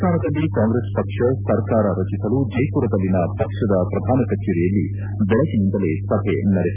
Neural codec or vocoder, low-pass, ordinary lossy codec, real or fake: none; 3.6 kHz; none; real